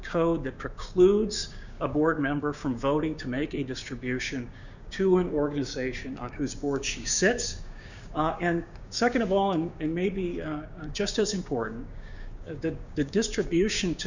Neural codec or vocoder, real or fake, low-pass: codec, 16 kHz, 6 kbps, DAC; fake; 7.2 kHz